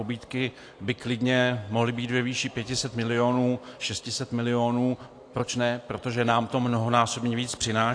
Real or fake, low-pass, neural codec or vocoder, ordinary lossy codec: real; 9.9 kHz; none; AAC, 48 kbps